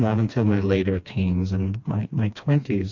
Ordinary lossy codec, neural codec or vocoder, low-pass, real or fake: AAC, 48 kbps; codec, 16 kHz, 2 kbps, FreqCodec, smaller model; 7.2 kHz; fake